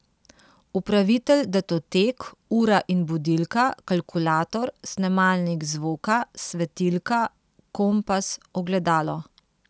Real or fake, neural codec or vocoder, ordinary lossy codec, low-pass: real; none; none; none